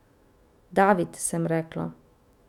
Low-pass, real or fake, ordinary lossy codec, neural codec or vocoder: 19.8 kHz; fake; none; autoencoder, 48 kHz, 128 numbers a frame, DAC-VAE, trained on Japanese speech